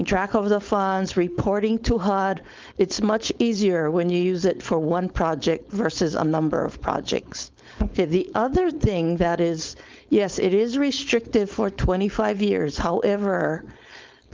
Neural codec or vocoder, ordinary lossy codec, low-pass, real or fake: codec, 16 kHz, 4.8 kbps, FACodec; Opus, 32 kbps; 7.2 kHz; fake